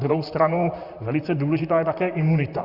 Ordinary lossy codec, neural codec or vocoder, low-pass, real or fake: Opus, 64 kbps; vocoder, 44.1 kHz, 128 mel bands, Pupu-Vocoder; 5.4 kHz; fake